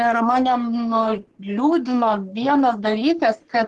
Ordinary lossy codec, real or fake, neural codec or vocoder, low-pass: Opus, 16 kbps; fake; codec, 44.1 kHz, 3.4 kbps, Pupu-Codec; 10.8 kHz